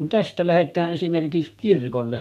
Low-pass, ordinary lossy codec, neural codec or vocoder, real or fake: 14.4 kHz; none; codec, 32 kHz, 1.9 kbps, SNAC; fake